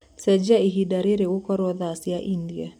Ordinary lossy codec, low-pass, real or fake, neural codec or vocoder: none; 19.8 kHz; fake; vocoder, 44.1 kHz, 128 mel bands every 256 samples, BigVGAN v2